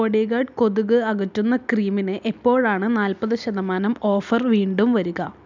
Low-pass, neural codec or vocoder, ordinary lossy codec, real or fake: 7.2 kHz; none; none; real